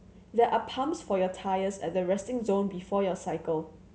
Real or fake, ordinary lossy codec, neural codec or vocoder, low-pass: real; none; none; none